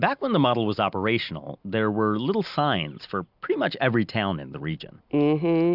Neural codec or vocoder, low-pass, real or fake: none; 5.4 kHz; real